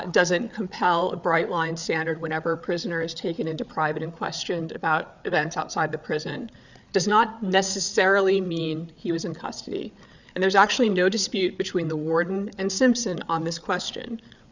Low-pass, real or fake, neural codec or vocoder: 7.2 kHz; fake; codec, 16 kHz, 8 kbps, FreqCodec, larger model